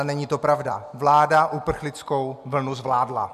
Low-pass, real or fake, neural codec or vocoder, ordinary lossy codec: 14.4 kHz; real; none; MP3, 96 kbps